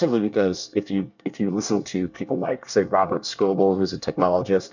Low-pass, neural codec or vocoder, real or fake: 7.2 kHz; codec, 24 kHz, 1 kbps, SNAC; fake